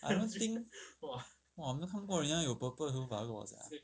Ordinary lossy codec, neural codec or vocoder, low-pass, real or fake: none; none; none; real